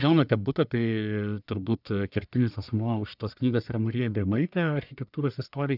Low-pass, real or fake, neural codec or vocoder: 5.4 kHz; fake; codec, 44.1 kHz, 1.7 kbps, Pupu-Codec